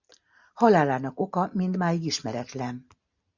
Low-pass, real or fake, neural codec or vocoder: 7.2 kHz; real; none